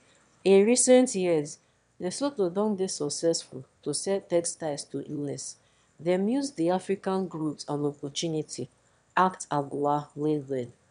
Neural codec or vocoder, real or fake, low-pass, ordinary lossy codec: autoencoder, 22.05 kHz, a latent of 192 numbers a frame, VITS, trained on one speaker; fake; 9.9 kHz; none